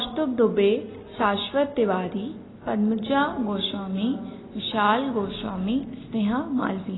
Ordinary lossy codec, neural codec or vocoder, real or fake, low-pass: AAC, 16 kbps; none; real; 7.2 kHz